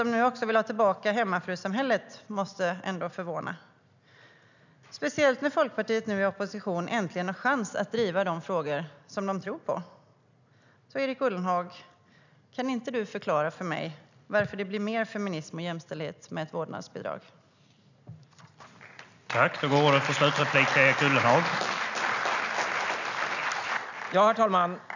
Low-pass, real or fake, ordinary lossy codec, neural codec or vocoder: 7.2 kHz; real; none; none